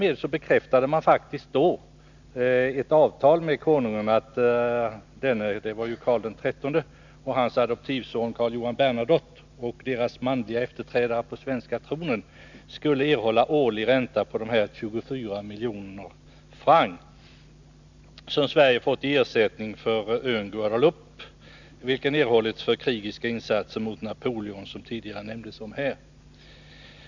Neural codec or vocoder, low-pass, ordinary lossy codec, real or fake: none; 7.2 kHz; none; real